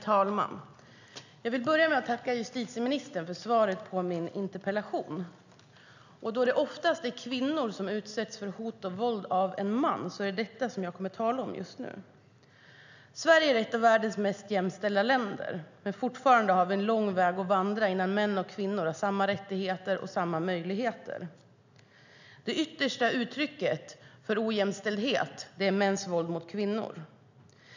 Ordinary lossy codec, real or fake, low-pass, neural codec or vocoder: none; real; 7.2 kHz; none